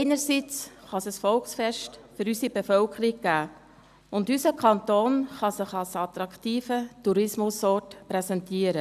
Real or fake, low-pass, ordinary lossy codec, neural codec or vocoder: real; 14.4 kHz; none; none